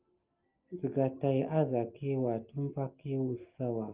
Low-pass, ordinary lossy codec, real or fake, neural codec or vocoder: 3.6 kHz; Opus, 32 kbps; real; none